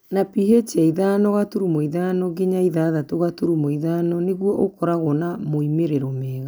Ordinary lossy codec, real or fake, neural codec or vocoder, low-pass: none; real; none; none